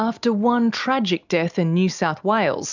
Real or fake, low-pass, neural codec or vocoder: real; 7.2 kHz; none